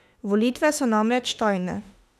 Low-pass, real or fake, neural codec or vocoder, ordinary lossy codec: 14.4 kHz; fake; autoencoder, 48 kHz, 32 numbers a frame, DAC-VAE, trained on Japanese speech; none